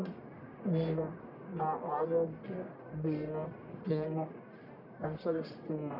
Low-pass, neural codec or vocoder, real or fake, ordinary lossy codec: 5.4 kHz; codec, 44.1 kHz, 1.7 kbps, Pupu-Codec; fake; none